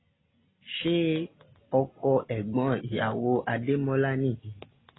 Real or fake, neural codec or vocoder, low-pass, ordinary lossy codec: real; none; 7.2 kHz; AAC, 16 kbps